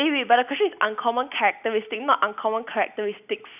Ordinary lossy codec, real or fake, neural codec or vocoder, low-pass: none; real; none; 3.6 kHz